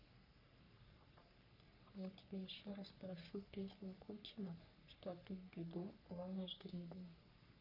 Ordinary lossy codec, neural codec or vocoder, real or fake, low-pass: none; codec, 44.1 kHz, 3.4 kbps, Pupu-Codec; fake; 5.4 kHz